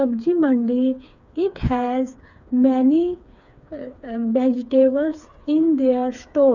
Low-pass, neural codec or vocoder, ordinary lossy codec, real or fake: 7.2 kHz; codec, 16 kHz, 4 kbps, FreqCodec, smaller model; none; fake